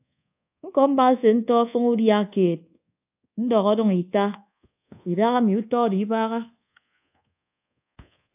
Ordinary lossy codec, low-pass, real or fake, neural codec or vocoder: AAC, 32 kbps; 3.6 kHz; fake; codec, 24 kHz, 1.2 kbps, DualCodec